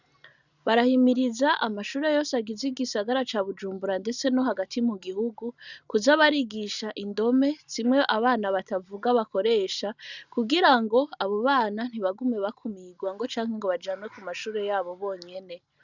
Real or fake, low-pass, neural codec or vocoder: real; 7.2 kHz; none